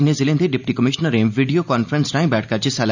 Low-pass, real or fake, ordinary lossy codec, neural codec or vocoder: 7.2 kHz; real; none; none